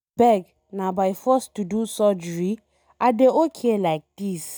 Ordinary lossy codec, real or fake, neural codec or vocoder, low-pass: none; real; none; none